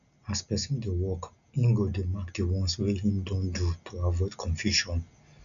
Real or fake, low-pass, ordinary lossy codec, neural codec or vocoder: real; 7.2 kHz; none; none